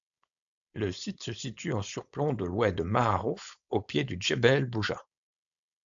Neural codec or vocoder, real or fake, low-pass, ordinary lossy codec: codec, 16 kHz, 4.8 kbps, FACodec; fake; 7.2 kHz; AAC, 64 kbps